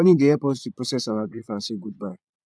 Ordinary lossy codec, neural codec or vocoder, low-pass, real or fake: none; vocoder, 22.05 kHz, 80 mel bands, Vocos; none; fake